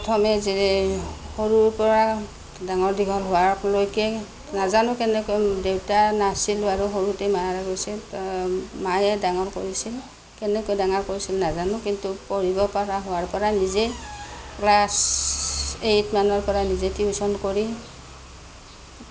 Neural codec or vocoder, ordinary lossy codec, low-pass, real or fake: none; none; none; real